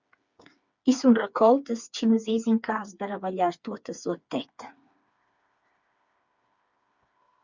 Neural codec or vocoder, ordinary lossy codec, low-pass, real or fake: codec, 16 kHz, 4 kbps, FreqCodec, smaller model; Opus, 64 kbps; 7.2 kHz; fake